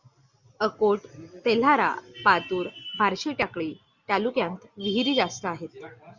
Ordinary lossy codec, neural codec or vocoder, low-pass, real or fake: Opus, 64 kbps; none; 7.2 kHz; real